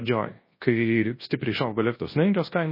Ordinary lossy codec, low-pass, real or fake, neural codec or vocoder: MP3, 24 kbps; 5.4 kHz; fake; codec, 24 kHz, 0.9 kbps, WavTokenizer, large speech release